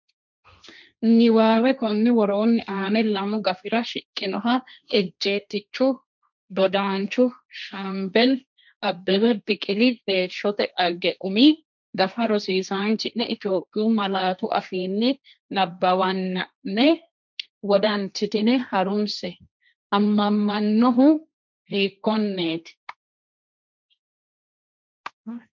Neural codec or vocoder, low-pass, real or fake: codec, 16 kHz, 1.1 kbps, Voila-Tokenizer; 7.2 kHz; fake